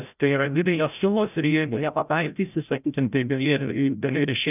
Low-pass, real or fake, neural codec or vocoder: 3.6 kHz; fake; codec, 16 kHz, 0.5 kbps, FreqCodec, larger model